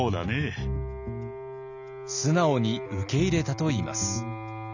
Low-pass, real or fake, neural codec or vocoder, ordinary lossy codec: 7.2 kHz; real; none; none